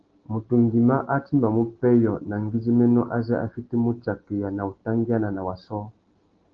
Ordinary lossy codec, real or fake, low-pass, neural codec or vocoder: Opus, 16 kbps; real; 7.2 kHz; none